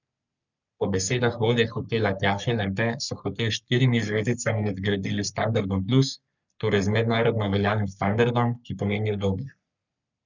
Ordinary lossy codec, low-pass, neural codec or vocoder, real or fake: none; 7.2 kHz; codec, 44.1 kHz, 3.4 kbps, Pupu-Codec; fake